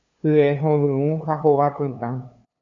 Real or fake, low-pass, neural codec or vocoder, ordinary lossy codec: fake; 7.2 kHz; codec, 16 kHz, 2 kbps, FunCodec, trained on LibriTTS, 25 frames a second; AAC, 64 kbps